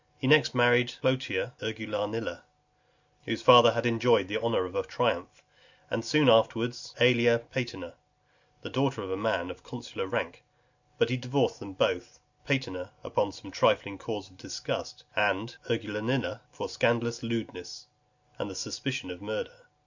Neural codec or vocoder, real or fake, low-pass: none; real; 7.2 kHz